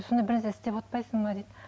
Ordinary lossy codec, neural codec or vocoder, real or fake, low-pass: none; none; real; none